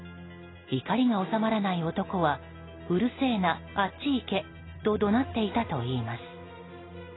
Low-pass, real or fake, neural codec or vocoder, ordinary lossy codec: 7.2 kHz; real; none; AAC, 16 kbps